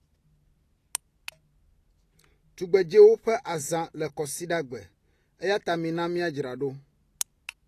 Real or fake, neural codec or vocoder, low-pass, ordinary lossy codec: real; none; 14.4 kHz; AAC, 48 kbps